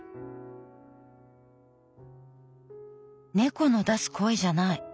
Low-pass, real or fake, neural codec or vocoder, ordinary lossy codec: none; real; none; none